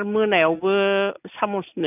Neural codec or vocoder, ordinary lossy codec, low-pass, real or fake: none; none; 3.6 kHz; real